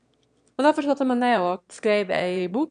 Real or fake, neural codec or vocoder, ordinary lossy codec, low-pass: fake; autoencoder, 22.05 kHz, a latent of 192 numbers a frame, VITS, trained on one speaker; none; 9.9 kHz